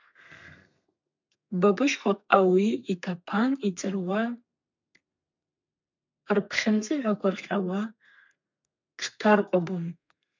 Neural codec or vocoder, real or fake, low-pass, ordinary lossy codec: codec, 32 kHz, 1.9 kbps, SNAC; fake; 7.2 kHz; MP3, 64 kbps